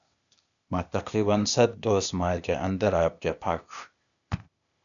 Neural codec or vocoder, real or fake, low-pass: codec, 16 kHz, 0.8 kbps, ZipCodec; fake; 7.2 kHz